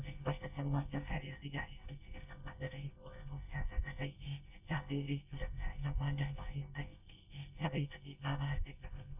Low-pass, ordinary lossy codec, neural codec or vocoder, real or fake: 3.6 kHz; none; codec, 24 kHz, 1 kbps, SNAC; fake